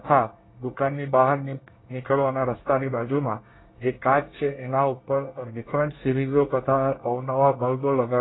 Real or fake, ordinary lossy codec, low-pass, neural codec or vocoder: fake; AAC, 16 kbps; 7.2 kHz; codec, 24 kHz, 1 kbps, SNAC